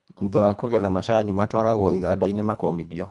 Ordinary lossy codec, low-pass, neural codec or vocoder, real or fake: none; 10.8 kHz; codec, 24 kHz, 1.5 kbps, HILCodec; fake